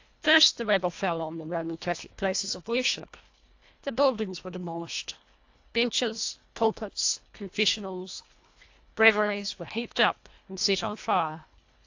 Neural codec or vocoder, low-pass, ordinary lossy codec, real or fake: codec, 24 kHz, 1.5 kbps, HILCodec; 7.2 kHz; AAC, 48 kbps; fake